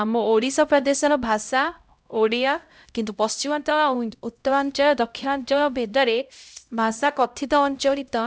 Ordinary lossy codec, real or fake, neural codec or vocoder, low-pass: none; fake; codec, 16 kHz, 0.5 kbps, X-Codec, HuBERT features, trained on LibriSpeech; none